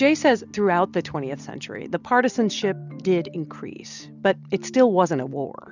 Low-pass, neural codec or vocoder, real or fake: 7.2 kHz; none; real